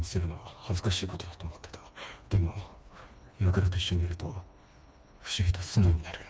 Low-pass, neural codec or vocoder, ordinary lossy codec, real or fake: none; codec, 16 kHz, 2 kbps, FreqCodec, smaller model; none; fake